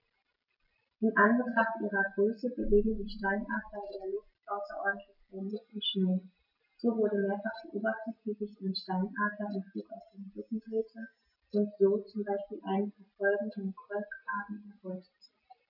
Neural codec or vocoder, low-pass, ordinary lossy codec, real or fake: none; 5.4 kHz; none; real